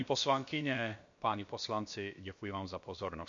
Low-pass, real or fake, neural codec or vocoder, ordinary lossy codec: 7.2 kHz; fake; codec, 16 kHz, about 1 kbps, DyCAST, with the encoder's durations; MP3, 48 kbps